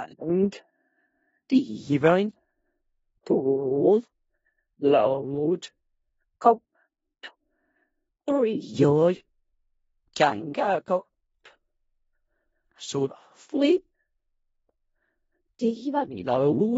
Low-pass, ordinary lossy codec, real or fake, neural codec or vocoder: 10.8 kHz; AAC, 24 kbps; fake; codec, 16 kHz in and 24 kHz out, 0.4 kbps, LongCat-Audio-Codec, four codebook decoder